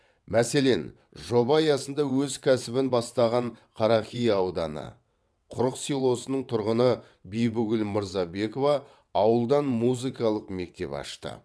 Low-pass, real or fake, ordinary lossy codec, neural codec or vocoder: none; fake; none; vocoder, 22.05 kHz, 80 mel bands, WaveNeXt